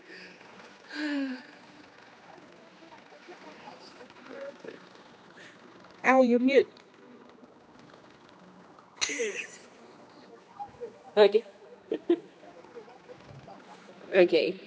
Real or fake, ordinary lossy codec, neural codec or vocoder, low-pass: fake; none; codec, 16 kHz, 2 kbps, X-Codec, HuBERT features, trained on general audio; none